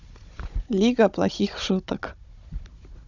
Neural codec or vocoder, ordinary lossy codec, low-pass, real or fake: codec, 16 kHz, 16 kbps, FunCodec, trained on Chinese and English, 50 frames a second; none; 7.2 kHz; fake